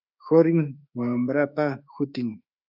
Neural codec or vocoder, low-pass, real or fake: autoencoder, 48 kHz, 32 numbers a frame, DAC-VAE, trained on Japanese speech; 5.4 kHz; fake